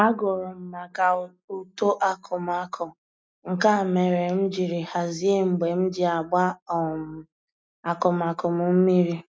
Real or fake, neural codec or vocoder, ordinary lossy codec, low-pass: real; none; none; none